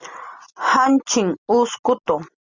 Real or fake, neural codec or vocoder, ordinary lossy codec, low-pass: real; none; Opus, 64 kbps; 7.2 kHz